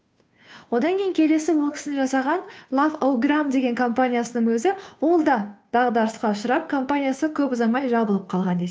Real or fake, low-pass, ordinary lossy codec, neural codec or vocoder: fake; none; none; codec, 16 kHz, 2 kbps, FunCodec, trained on Chinese and English, 25 frames a second